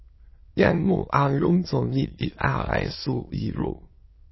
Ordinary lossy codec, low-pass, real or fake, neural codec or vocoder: MP3, 24 kbps; 7.2 kHz; fake; autoencoder, 22.05 kHz, a latent of 192 numbers a frame, VITS, trained on many speakers